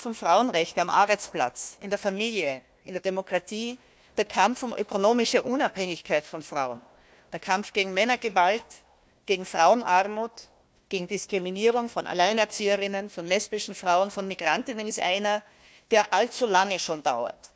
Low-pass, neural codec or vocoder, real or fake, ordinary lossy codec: none; codec, 16 kHz, 1 kbps, FunCodec, trained on Chinese and English, 50 frames a second; fake; none